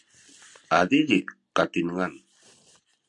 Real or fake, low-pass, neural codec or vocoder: real; 10.8 kHz; none